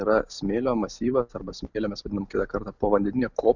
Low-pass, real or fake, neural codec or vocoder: 7.2 kHz; real; none